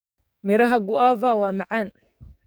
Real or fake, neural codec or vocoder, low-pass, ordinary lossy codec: fake; codec, 44.1 kHz, 2.6 kbps, SNAC; none; none